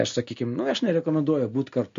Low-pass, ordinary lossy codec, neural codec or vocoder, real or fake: 7.2 kHz; MP3, 48 kbps; none; real